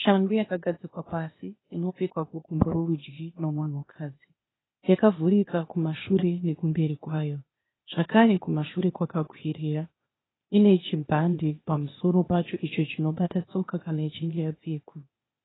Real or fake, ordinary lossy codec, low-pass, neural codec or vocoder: fake; AAC, 16 kbps; 7.2 kHz; codec, 16 kHz, 0.8 kbps, ZipCodec